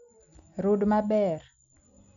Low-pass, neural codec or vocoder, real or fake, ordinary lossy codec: 7.2 kHz; none; real; none